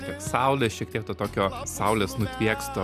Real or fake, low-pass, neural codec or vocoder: real; 14.4 kHz; none